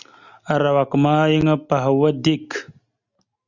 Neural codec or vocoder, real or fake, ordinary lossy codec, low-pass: none; real; Opus, 64 kbps; 7.2 kHz